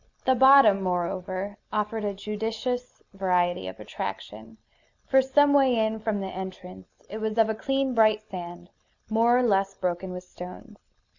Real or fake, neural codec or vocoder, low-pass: real; none; 7.2 kHz